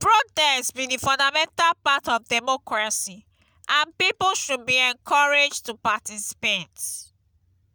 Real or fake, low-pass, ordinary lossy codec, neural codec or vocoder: real; none; none; none